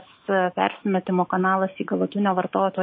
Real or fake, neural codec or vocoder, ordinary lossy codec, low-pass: fake; autoencoder, 48 kHz, 128 numbers a frame, DAC-VAE, trained on Japanese speech; MP3, 24 kbps; 7.2 kHz